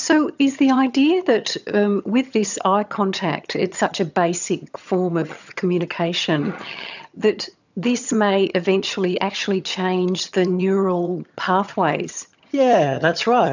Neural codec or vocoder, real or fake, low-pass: vocoder, 22.05 kHz, 80 mel bands, HiFi-GAN; fake; 7.2 kHz